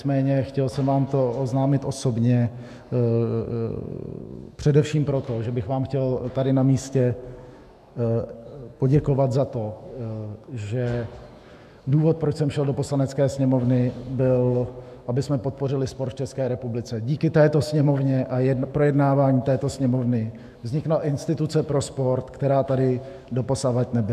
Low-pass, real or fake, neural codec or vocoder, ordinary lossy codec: 14.4 kHz; fake; autoencoder, 48 kHz, 128 numbers a frame, DAC-VAE, trained on Japanese speech; MP3, 96 kbps